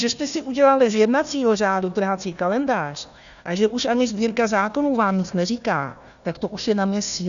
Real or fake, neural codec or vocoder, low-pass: fake; codec, 16 kHz, 1 kbps, FunCodec, trained on Chinese and English, 50 frames a second; 7.2 kHz